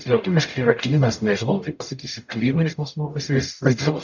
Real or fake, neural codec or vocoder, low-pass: fake; codec, 44.1 kHz, 0.9 kbps, DAC; 7.2 kHz